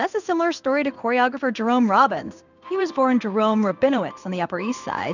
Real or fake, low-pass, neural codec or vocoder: fake; 7.2 kHz; codec, 16 kHz in and 24 kHz out, 1 kbps, XY-Tokenizer